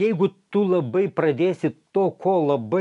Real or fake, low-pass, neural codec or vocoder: real; 10.8 kHz; none